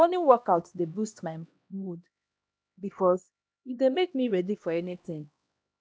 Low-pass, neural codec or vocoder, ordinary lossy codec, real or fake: none; codec, 16 kHz, 1 kbps, X-Codec, HuBERT features, trained on LibriSpeech; none; fake